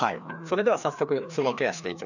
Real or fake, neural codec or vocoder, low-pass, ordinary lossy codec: fake; codec, 16 kHz, 2 kbps, FreqCodec, larger model; 7.2 kHz; none